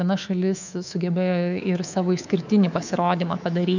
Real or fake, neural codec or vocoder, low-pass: fake; codec, 24 kHz, 3.1 kbps, DualCodec; 7.2 kHz